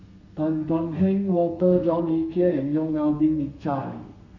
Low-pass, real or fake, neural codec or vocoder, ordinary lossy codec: 7.2 kHz; fake; codec, 32 kHz, 1.9 kbps, SNAC; none